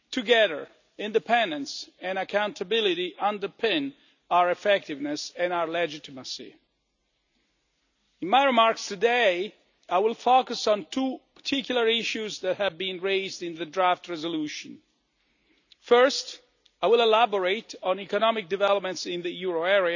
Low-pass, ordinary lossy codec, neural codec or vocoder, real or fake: 7.2 kHz; none; none; real